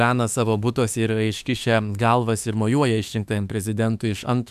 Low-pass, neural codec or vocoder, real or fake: 14.4 kHz; autoencoder, 48 kHz, 32 numbers a frame, DAC-VAE, trained on Japanese speech; fake